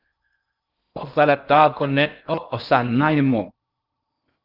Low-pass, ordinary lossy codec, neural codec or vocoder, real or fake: 5.4 kHz; Opus, 32 kbps; codec, 16 kHz in and 24 kHz out, 0.6 kbps, FocalCodec, streaming, 2048 codes; fake